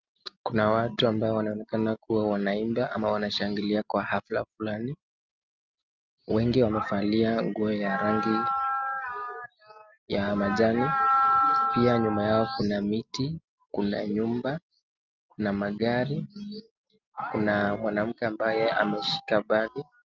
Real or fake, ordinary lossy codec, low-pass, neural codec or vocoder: real; Opus, 24 kbps; 7.2 kHz; none